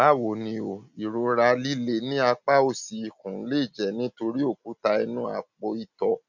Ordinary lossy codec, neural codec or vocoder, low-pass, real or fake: none; none; 7.2 kHz; real